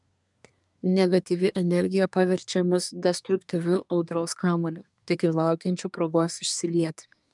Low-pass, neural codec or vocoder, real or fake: 10.8 kHz; codec, 24 kHz, 1 kbps, SNAC; fake